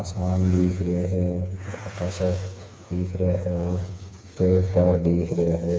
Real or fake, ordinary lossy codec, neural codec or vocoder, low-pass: fake; none; codec, 16 kHz, 4 kbps, FreqCodec, smaller model; none